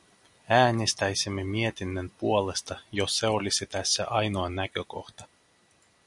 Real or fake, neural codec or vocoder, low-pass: real; none; 10.8 kHz